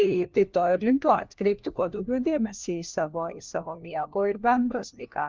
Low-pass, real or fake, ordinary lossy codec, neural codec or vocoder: 7.2 kHz; fake; Opus, 32 kbps; codec, 16 kHz, 1 kbps, FunCodec, trained on LibriTTS, 50 frames a second